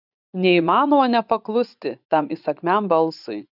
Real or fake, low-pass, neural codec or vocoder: fake; 5.4 kHz; vocoder, 24 kHz, 100 mel bands, Vocos